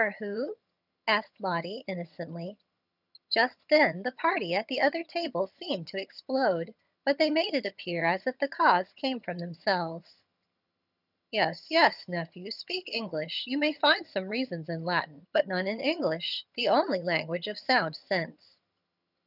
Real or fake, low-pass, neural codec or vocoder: fake; 5.4 kHz; vocoder, 22.05 kHz, 80 mel bands, HiFi-GAN